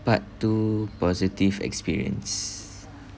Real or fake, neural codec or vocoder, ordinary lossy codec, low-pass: real; none; none; none